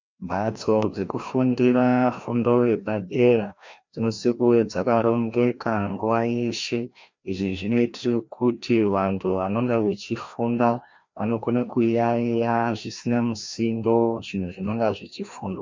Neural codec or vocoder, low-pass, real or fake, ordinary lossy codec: codec, 16 kHz, 1 kbps, FreqCodec, larger model; 7.2 kHz; fake; MP3, 64 kbps